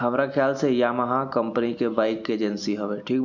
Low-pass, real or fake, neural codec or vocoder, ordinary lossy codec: 7.2 kHz; real; none; none